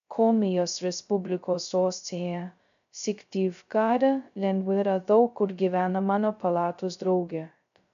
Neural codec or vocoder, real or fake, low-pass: codec, 16 kHz, 0.2 kbps, FocalCodec; fake; 7.2 kHz